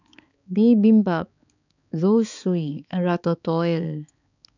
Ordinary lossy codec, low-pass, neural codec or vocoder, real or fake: none; 7.2 kHz; codec, 16 kHz, 4 kbps, X-Codec, HuBERT features, trained on balanced general audio; fake